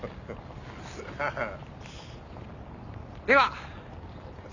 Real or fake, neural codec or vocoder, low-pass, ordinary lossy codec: real; none; 7.2 kHz; none